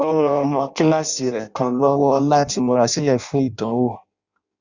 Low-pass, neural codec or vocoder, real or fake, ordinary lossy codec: 7.2 kHz; codec, 16 kHz in and 24 kHz out, 0.6 kbps, FireRedTTS-2 codec; fake; Opus, 64 kbps